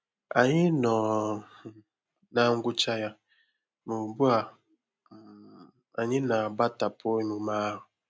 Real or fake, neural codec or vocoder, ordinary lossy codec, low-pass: real; none; none; none